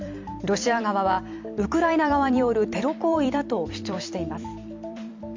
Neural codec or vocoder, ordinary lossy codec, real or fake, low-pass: vocoder, 44.1 kHz, 128 mel bands every 256 samples, BigVGAN v2; MP3, 64 kbps; fake; 7.2 kHz